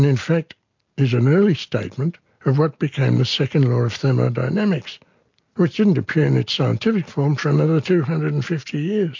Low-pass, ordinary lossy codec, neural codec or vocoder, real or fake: 7.2 kHz; MP3, 48 kbps; none; real